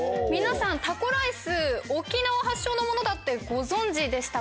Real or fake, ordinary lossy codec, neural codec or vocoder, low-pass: real; none; none; none